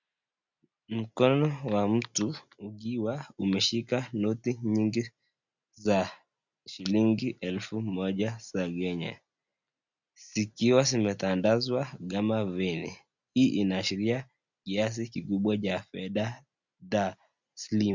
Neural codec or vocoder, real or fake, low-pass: none; real; 7.2 kHz